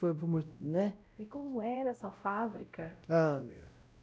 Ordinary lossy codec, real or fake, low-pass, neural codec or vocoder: none; fake; none; codec, 16 kHz, 0.5 kbps, X-Codec, WavLM features, trained on Multilingual LibriSpeech